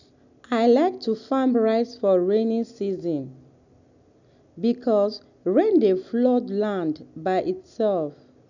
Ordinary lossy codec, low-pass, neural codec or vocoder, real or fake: none; 7.2 kHz; none; real